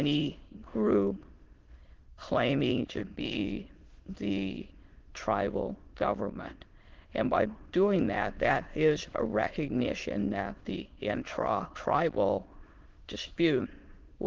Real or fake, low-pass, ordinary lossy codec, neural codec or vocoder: fake; 7.2 kHz; Opus, 16 kbps; autoencoder, 22.05 kHz, a latent of 192 numbers a frame, VITS, trained on many speakers